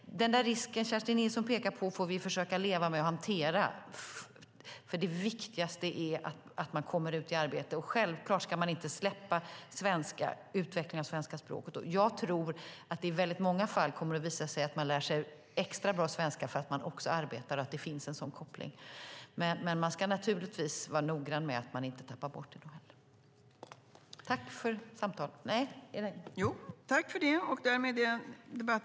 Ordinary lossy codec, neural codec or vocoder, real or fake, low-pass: none; none; real; none